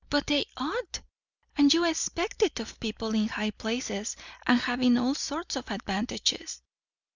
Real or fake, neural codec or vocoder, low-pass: real; none; 7.2 kHz